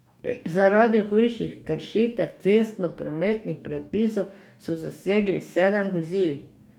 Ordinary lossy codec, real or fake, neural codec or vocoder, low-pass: none; fake; codec, 44.1 kHz, 2.6 kbps, DAC; 19.8 kHz